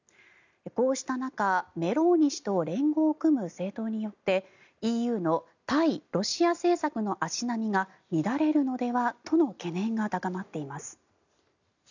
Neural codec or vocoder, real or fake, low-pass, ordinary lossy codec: none; real; 7.2 kHz; none